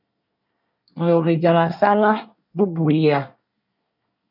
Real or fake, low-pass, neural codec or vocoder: fake; 5.4 kHz; codec, 24 kHz, 1 kbps, SNAC